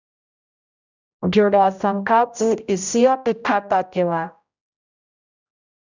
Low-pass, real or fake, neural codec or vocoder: 7.2 kHz; fake; codec, 16 kHz, 0.5 kbps, X-Codec, HuBERT features, trained on general audio